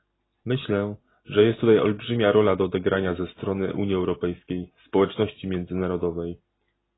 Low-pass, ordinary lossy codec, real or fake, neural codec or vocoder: 7.2 kHz; AAC, 16 kbps; real; none